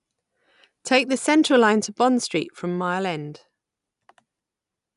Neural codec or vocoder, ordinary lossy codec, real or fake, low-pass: none; none; real; 10.8 kHz